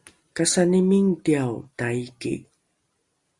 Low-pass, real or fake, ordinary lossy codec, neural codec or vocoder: 10.8 kHz; real; Opus, 64 kbps; none